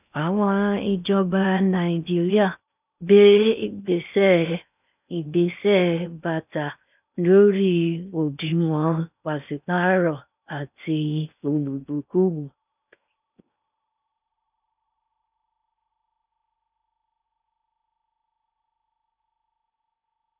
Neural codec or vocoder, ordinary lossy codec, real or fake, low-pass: codec, 16 kHz in and 24 kHz out, 0.6 kbps, FocalCodec, streaming, 2048 codes; none; fake; 3.6 kHz